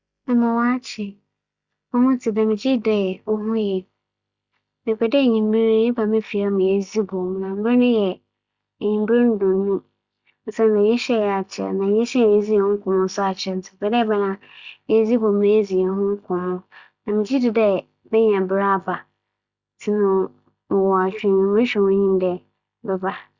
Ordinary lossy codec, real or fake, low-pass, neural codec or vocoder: Opus, 64 kbps; real; 7.2 kHz; none